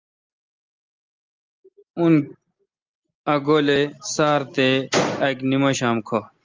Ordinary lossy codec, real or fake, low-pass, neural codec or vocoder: Opus, 32 kbps; real; 7.2 kHz; none